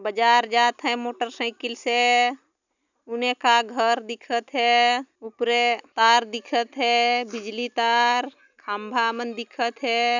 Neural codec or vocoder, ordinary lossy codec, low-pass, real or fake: none; none; 7.2 kHz; real